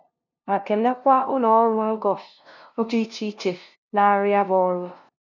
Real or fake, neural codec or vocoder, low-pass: fake; codec, 16 kHz, 0.5 kbps, FunCodec, trained on LibriTTS, 25 frames a second; 7.2 kHz